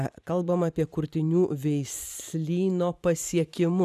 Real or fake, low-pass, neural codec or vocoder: fake; 14.4 kHz; vocoder, 44.1 kHz, 128 mel bands every 512 samples, BigVGAN v2